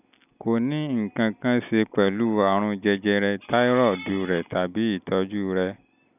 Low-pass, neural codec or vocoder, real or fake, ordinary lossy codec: 3.6 kHz; none; real; none